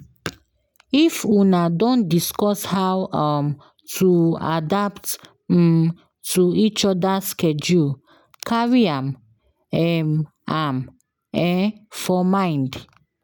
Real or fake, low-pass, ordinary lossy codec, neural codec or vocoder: real; none; none; none